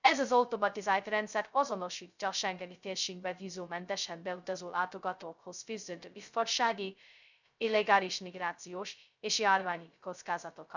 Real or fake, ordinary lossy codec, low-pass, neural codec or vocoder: fake; none; 7.2 kHz; codec, 16 kHz, 0.2 kbps, FocalCodec